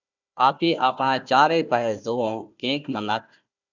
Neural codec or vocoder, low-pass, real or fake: codec, 16 kHz, 1 kbps, FunCodec, trained on Chinese and English, 50 frames a second; 7.2 kHz; fake